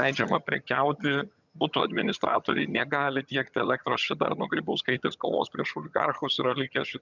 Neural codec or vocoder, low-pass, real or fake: vocoder, 22.05 kHz, 80 mel bands, HiFi-GAN; 7.2 kHz; fake